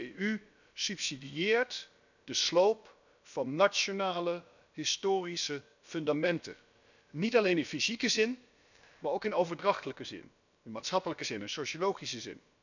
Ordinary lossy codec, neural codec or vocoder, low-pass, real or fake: none; codec, 16 kHz, about 1 kbps, DyCAST, with the encoder's durations; 7.2 kHz; fake